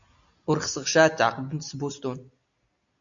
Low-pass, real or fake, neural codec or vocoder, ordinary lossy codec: 7.2 kHz; real; none; AAC, 64 kbps